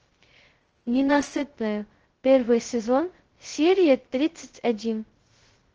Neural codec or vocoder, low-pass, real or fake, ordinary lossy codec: codec, 16 kHz, 0.2 kbps, FocalCodec; 7.2 kHz; fake; Opus, 16 kbps